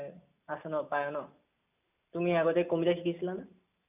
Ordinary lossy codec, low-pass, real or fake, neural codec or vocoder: none; 3.6 kHz; real; none